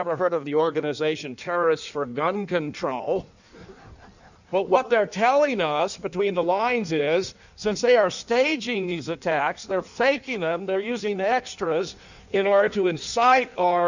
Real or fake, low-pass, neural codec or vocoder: fake; 7.2 kHz; codec, 16 kHz in and 24 kHz out, 1.1 kbps, FireRedTTS-2 codec